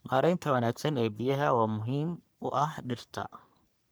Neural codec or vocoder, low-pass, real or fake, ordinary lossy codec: codec, 44.1 kHz, 3.4 kbps, Pupu-Codec; none; fake; none